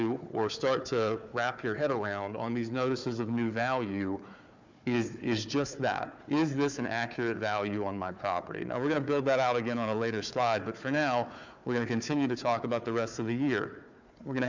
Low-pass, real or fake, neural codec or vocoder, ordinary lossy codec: 7.2 kHz; fake; codec, 16 kHz, 4 kbps, FunCodec, trained on Chinese and English, 50 frames a second; MP3, 64 kbps